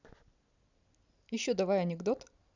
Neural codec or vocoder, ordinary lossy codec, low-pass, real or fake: none; none; 7.2 kHz; real